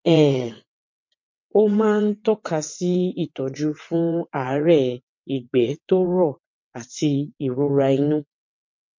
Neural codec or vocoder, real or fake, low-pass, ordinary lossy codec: vocoder, 22.05 kHz, 80 mel bands, WaveNeXt; fake; 7.2 kHz; MP3, 48 kbps